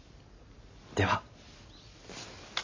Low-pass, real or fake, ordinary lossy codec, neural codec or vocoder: 7.2 kHz; real; MP3, 32 kbps; none